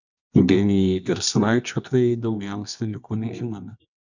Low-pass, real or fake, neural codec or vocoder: 7.2 kHz; fake; codec, 24 kHz, 0.9 kbps, WavTokenizer, medium music audio release